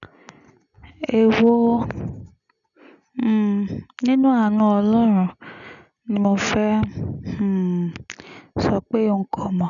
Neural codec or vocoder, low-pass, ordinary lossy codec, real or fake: none; 7.2 kHz; none; real